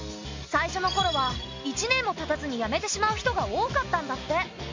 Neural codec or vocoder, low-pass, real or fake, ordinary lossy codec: none; 7.2 kHz; real; MP3, 48 kbps